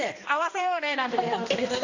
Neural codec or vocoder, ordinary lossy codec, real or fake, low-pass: codec, 16 kHz, 1 kbps, X-Codec, HuBERT features, trained on balanced general audio; AAC, 48 kbps; fake; 7.2 kHz